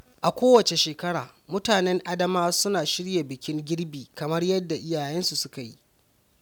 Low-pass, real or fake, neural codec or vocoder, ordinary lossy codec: none; real; none; none